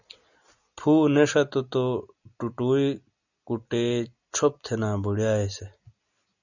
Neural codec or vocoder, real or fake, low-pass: none; real; 7.2 kHz